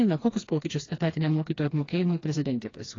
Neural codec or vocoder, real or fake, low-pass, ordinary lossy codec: codec, 16 kHz, 2 kbps, FreqCodec, smaller model; fake; 7.2 kHz; AAC, 32 kbps